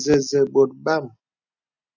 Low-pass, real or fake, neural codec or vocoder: 7.2 kHz; real; none